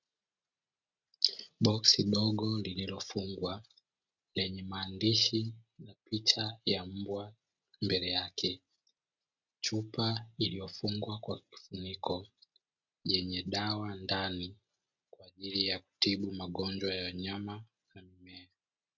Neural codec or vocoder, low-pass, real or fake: none; 7.2 kHz; real